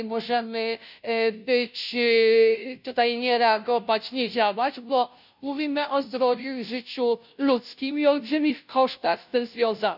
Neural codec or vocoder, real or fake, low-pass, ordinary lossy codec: codec, 16 kHz, 0.5 kbps, FunCodec, trained on Chinese and English, 25 frames a second; fake; 5.4 kHz; none